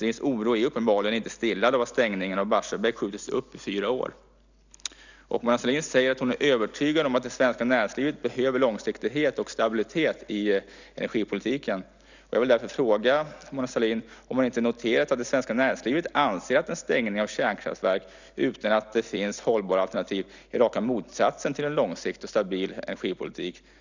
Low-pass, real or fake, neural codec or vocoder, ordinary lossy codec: 7.2 kHz; real; none; none